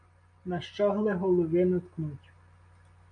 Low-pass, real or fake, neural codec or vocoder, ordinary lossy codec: 9.9 kHz; real; none; MP3, 96 kbps